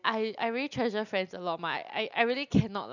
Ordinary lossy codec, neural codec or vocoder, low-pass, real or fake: none; none; 7.2 kHz; real